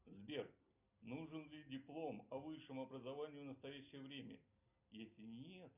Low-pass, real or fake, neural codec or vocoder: 3.6 kHz; real; none